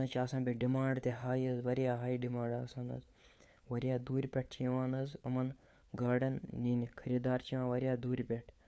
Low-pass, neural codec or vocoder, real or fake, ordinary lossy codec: none; codec, 16 kHz, 4 kbps, FunCodec, trained on LibriTTS, 50 frames a second; fake; none